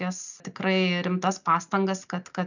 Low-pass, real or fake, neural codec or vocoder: 7.2 kHz; real; none